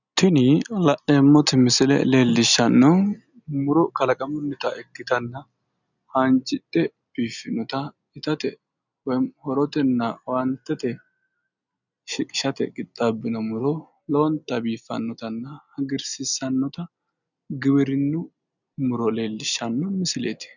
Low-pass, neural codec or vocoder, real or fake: 7.2 kHz; none; real